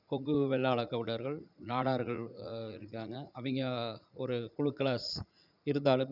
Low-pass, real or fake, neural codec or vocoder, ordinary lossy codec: 5.4 kHz; fake; vocoder, 44.1 kHz, 80 mel bands, Vocos; none